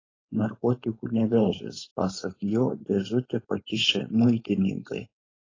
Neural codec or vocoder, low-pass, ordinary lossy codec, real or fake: codec, 16 kHz, 4.8 kbps, FACodec; 7.2 kHz; AAC, 32 kbps; fake